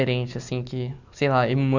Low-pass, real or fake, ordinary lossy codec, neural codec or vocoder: 7.2 kHz; real; none; none